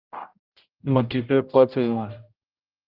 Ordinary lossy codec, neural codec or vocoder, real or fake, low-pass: Opus, 24 kbps; codec, 16 kHz, 0.5 kbps, X-Codec, HuBERT features, trained on general audio; fake; 5.4 kHz